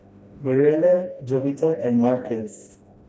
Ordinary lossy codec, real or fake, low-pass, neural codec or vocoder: none; fake; none; codec, 16 kHz, 1 kbps, FreqCodec, smaller model